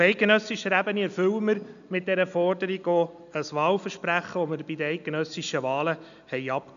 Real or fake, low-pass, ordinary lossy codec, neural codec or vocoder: real; 7.2 kHz; none; none